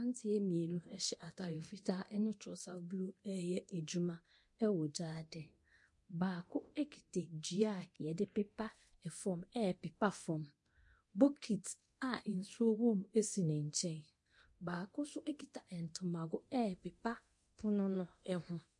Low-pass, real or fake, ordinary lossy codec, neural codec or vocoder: 10.8 kHz; fake; MP3, 48 kbps; codec, 24 kHz, 0.9 kbps, DualCodec